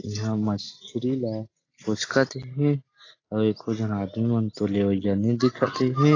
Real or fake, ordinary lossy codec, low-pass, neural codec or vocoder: real; AAC, 32 kbps; 7.2 kHz; none